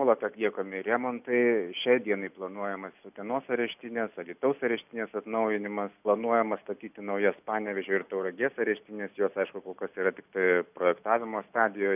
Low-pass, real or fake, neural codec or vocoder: 3.6 kHz; real; none